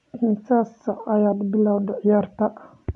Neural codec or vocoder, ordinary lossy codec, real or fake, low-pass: none; none; real; 10.8 kHz